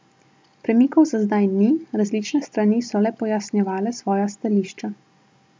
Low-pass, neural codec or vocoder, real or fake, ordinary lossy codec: none; none; real; none